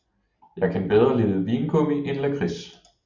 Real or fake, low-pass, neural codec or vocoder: real; 7.2 kHz; none